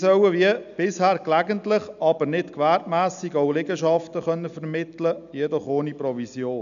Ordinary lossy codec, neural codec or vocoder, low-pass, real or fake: none; none; 7.2 kHz; real